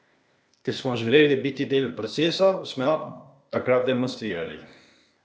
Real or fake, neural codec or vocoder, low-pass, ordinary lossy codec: fake; codec, 16 kHz, 0.8 kbps, ZipCodec; none; none